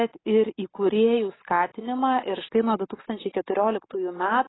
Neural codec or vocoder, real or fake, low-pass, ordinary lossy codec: none; real; 7.2 kHz; AAC, 16 kbps